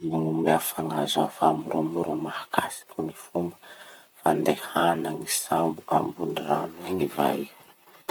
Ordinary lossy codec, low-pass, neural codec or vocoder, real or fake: none; none; vocoder, 44.1 kHz, 128 mel bands, Pupu-Vocoder; fake